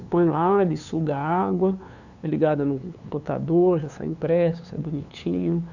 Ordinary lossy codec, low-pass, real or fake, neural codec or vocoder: none; 7.2 kHz; fake; codec, 16 kHz, 2 kbps, FunCodec, trained on LibriTTS, 25 frames a second